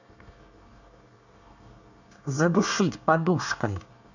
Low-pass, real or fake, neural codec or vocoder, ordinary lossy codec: 7.2 kHz; fake; codec, 24 kHz, 1 kbps, SNAC; none